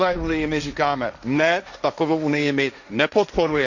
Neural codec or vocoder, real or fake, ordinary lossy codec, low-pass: codec, 16 kHz, 1.1 kbps, Voila-Tokenizer; fake; none; 7.2 kHz